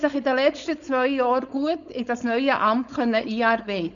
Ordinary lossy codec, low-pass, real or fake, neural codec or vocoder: none; 7.2 kHz; fake; codec, 16 kHz, 4.8 kbps, FACodec